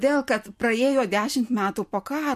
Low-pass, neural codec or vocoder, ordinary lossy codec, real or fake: 14.4 kHz; none; MP3, 64 kbps; real